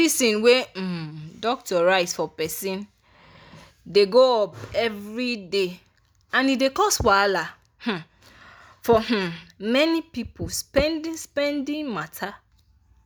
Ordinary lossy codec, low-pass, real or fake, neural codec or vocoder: none; none; real; none